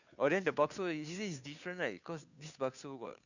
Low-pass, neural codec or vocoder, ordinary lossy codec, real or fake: 7.2 kHz; codec, 16 kHz, 2 kbps, FunCodec, trained on Chinese and English, 25 frames a second; none; fake